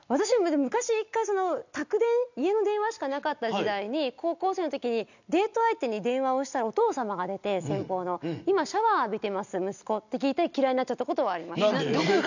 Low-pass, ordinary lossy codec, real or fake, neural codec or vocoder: 7.2 kHz; none; real; none